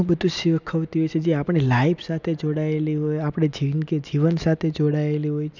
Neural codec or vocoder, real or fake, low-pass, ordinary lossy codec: none; real; 7.2 kHz; none